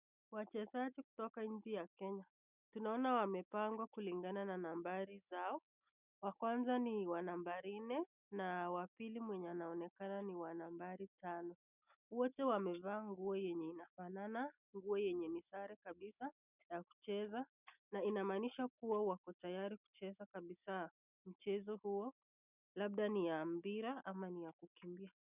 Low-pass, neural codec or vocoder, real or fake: 3.6 kHz; none; real